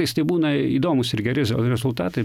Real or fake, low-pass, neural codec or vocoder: real; 19.8 kHz; none